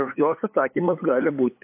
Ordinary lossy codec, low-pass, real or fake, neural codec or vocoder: MP3, 24 kbps; 3.6 kHz; fake; codec, 16 kHz, 8 kbps, FunCodec, trained on LibriTTS, 25 frames a second